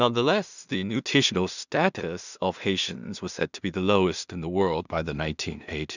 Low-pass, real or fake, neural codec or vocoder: 7.2 kHz; fake; codec, 16 kHz in and 24 kHz out, 0.4 kbps, LongCat-Audio-Codec, two codebook decoder